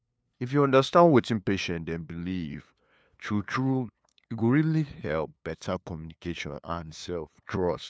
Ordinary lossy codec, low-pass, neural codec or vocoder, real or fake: none; none; codec, 16 kHz, 2 kbps, FunCodec, trained on LibriTTS, 25 frames a second; fake